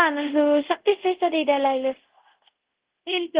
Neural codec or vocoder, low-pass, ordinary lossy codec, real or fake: codec, 24 kHz, 0.5 kbps, DualCodec; 3.6 kHz; Opus, 16 kbps; fake